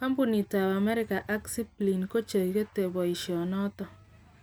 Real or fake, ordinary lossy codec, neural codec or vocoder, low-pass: real; none; none; none